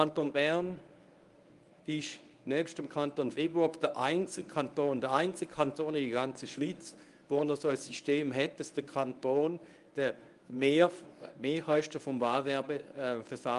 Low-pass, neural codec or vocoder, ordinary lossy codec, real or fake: 10.8 kHz; codec, 24 kHz, 0.9 kbps, WavTokenizer, medium speech release version 1; Opus, 32 kbps; fake